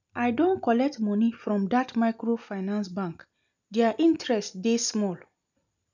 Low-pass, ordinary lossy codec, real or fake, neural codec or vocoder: 7.2 kHz; none; real; none